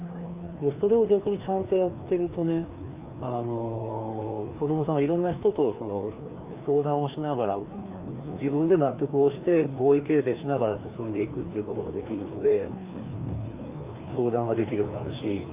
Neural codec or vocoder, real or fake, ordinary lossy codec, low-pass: codec, 16 kHz, 2 kbps, FreqCodec, larger model; fake; Opus, 64 kbps; 3.6 kHz